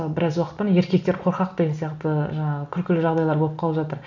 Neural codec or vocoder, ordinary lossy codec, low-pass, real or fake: none; none; 7.2 kHz; real